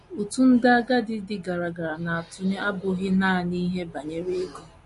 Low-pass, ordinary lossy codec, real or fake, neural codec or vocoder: 14.4 kHz; MP3, 48 kbps; real; none